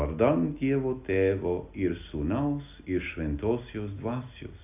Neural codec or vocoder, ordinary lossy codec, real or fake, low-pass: none; AAC, 32 kbps; real; 3.6 kHz